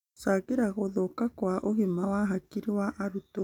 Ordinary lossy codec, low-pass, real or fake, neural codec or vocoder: none; 19.8 kHz; real; none